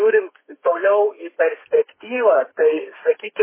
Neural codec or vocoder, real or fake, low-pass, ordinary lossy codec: codec, 32 kHz, 1.9 kbps, SNAC; fake; 3.6 kHz; MP3, 16 kbps